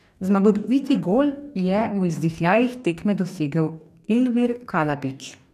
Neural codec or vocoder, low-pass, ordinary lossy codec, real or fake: codec, 44.1 kHz, 2.6 kbps, DAC; 14.4 kHz; none; fake